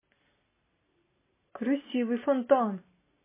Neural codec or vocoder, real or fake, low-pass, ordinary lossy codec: none; real; 3.6 kHz; MP3, 16 kbps